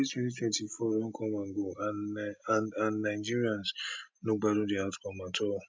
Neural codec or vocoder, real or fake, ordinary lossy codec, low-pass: none; real; none; none